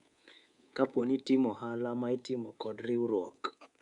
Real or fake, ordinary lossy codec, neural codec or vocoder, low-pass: fake; Opus, 64 kbps; codec, 24 kHz, 3.1 kbps, DualCodec; 10.8 kHz